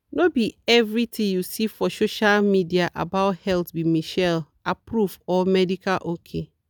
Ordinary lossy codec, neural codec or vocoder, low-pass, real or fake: none; none; none; real